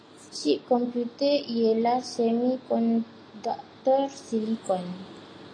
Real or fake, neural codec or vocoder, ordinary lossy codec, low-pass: real; none; AAC, 32 kbps; 9.9 kHz